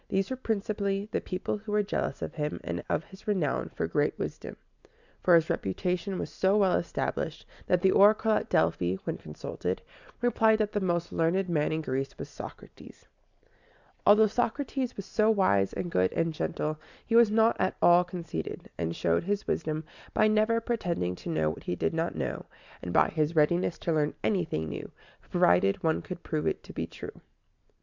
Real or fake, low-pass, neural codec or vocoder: real; 7.2 kHz; none